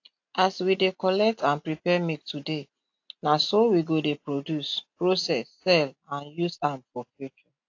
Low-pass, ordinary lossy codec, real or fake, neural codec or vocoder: 7.2 kHz; AAC, 48 kbps; real; none